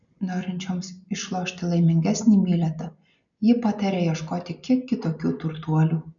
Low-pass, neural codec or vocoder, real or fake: 7.2 kHz; none; real